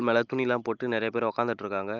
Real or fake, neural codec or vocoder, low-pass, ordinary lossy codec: real; none; 7.2 kHz; Opus, 32 kbps